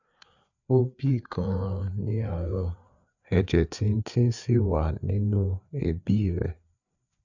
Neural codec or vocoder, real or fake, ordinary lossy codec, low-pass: codec, 16 kHz, 4 kbps, FreqCodec, larger model; fake; none; 7.2 kHz